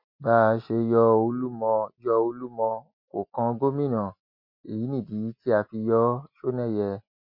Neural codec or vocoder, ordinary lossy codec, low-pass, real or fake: none; MP3, 32 kbps; 5.4 kHz; real